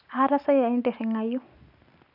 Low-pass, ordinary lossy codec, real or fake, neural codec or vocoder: 5.4 kHz; none; real; none